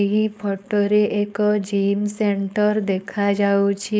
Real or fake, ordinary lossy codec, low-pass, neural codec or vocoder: fake; none; none; codec, 16 kHz, 4.8 kbps, FACodec